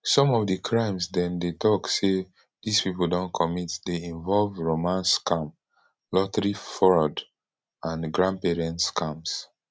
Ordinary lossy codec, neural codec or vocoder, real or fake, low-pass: none; none; real; none